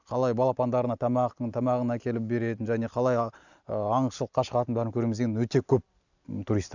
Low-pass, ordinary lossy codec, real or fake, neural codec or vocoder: 7.2 kHz; none; real; none